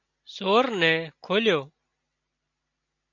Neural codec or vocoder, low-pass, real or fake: none; 7.2 kHz; real